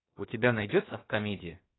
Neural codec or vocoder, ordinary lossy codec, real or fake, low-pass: codec, 16 kHz, about 1 kbps, DyCAST, with the encoder's durations; AAC, 16 kbps; fake; 7.2 kHz